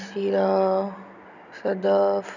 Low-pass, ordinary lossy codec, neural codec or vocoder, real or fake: 7.2 kHz; none; none; real